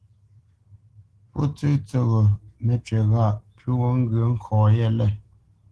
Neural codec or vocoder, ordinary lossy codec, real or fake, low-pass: autoencoder, 48 kHz, 128 numbers a frame, DAC-VAE, trained on Japanese speech; Opus, 16 kbps; fake; 10.8 kHz